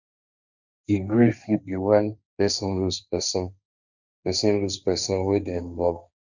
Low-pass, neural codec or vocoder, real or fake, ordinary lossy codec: 7.2 kHz; codec, 16 kHz, 1.1 kbps, Voila-Tokenizer; fake; none